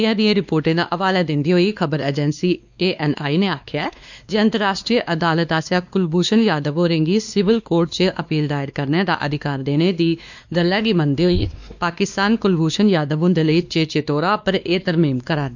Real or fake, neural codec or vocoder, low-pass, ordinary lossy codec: fake; codec, 16 kHz, 2 kbps, X-Codec, WavLM features, trained on Multilingual LibriSpeech; 7.2 kHz; none